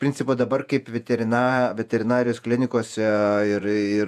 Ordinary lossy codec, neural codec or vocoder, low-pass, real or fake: MP3, 96 kbps; vocoder, 48 kHz, 128 mel bands, Vocos; 14.4 kHz; fake